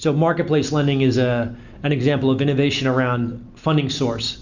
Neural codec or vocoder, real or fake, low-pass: none; real; 7.2 kHz